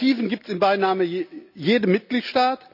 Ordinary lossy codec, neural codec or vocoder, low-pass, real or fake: none; none; 5.4 kHz; real